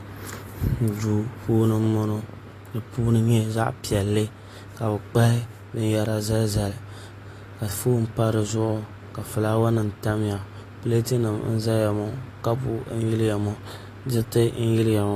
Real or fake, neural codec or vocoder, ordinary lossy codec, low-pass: real; none; AAC, 48 kbps; 14.4 kHz